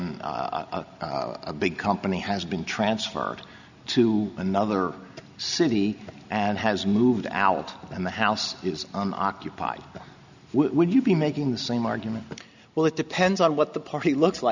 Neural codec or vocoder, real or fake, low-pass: none; real; 7.2 kHz